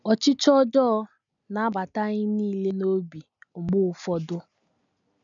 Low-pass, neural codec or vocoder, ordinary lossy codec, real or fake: 7.2 kHz; none; MP3, 96 kbps; real